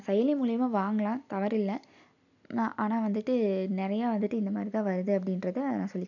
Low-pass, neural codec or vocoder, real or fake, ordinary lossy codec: 7.2 kHz; none; real; none